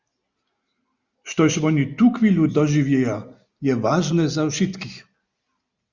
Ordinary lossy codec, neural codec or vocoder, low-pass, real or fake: Opus, 64 kbps; none; 7.2 kHz; real